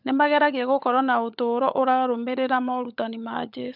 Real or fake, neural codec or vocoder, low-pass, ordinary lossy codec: fake; codec, 16 kHz, 16 kbps, FunCodec, trained on LibriTTS, 50 frames a second; 5.4 kHz; none